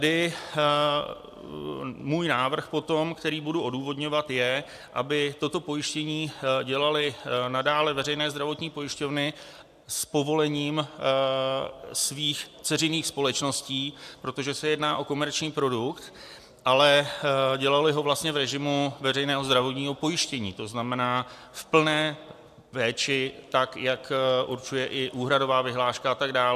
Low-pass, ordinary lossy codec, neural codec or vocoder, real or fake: 14.4 kHz; AAC, 96 kbps; none; real